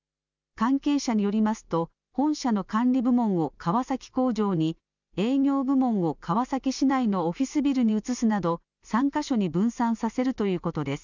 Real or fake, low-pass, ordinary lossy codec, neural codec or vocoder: real; 7.2 kHz; none; none